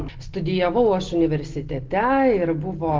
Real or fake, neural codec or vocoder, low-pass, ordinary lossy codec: real; none; 7.2 kHz; Opus, 16 kbps